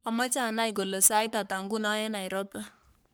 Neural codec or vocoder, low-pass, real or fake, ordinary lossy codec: codec, 44.1 kHz, 3.4 kbps, Pupu-Codec; none; fake; none